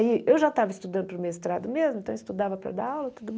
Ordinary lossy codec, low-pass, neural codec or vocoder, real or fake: none; none; none; real